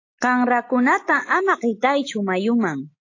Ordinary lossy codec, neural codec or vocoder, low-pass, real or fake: AAC, 48 kbps; none; 7.2 kHz; real